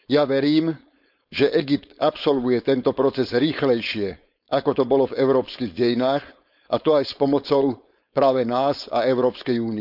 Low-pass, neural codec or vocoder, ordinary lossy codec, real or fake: 5.4 kHz; codec, 16 kHz, 4.8 kbps, FACodec; none; fake